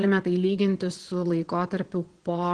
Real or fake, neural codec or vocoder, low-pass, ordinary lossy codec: fake; vocoder, 22.05 kHz, 80 mel bands, WaveNeXt; 9.9 kHz; Opus, 16 kbps